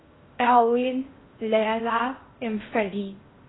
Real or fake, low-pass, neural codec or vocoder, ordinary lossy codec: fake; 7.2 kHz; codec, 16 kHz in and 24 kHz out, 0.6 kbps, FocalCodec, streaming, 4096 codes; AAC, 16 kbps